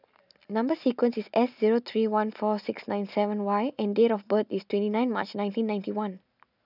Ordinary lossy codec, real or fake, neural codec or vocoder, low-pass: none; real; none; 5.4 kHz